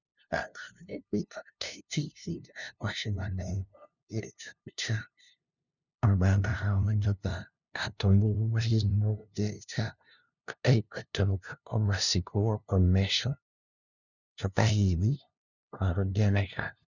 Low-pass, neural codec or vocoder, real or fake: 7.2 kHz; codec, 16 kHz, 0.5 kbps, FunCodec, trained on LibriTTS, 25 frames a second; fake